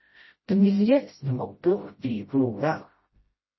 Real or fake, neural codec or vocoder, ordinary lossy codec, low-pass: fake; codec, 16 kHz, 0.5 kbps, FreqCodec, smaller model; MP3, 24 kbps; 7.2 kHz